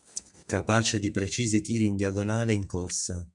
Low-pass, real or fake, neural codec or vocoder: 10.8 kHz; fake; codec, 32 kHz, 1.9 kbps, SNAC